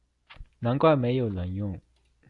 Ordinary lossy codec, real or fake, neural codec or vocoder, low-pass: AAC, 64 kbps; real; none; 10.8 kHz